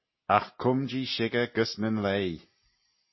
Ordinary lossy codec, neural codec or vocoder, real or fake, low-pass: MP3, 24 kbps; none; real; 7.2 kHz